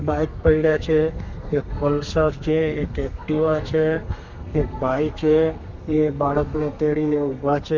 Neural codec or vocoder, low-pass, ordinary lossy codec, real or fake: codec, 32 kHz, 1.9 kbps, SNAC; 7.2 kHz; none; fake